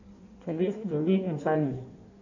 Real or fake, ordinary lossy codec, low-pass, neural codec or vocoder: fake; none; 7.2 kHz; codec, 16 kHz in and 24 kHz out, 1.1 kbps, FireRedTTS-2 codec